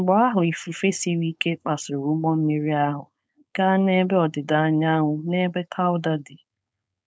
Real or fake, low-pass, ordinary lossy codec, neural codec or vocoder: fake; none; none; codec, 16 kHz, 4.8 kbps, FACodec